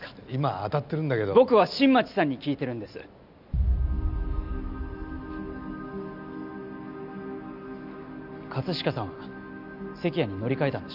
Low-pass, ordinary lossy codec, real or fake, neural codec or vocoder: 5.4 kHz; none; real; none